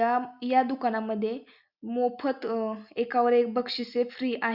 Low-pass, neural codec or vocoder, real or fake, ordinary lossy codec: 5.4 kHz; none; real; Opus, 64 kbps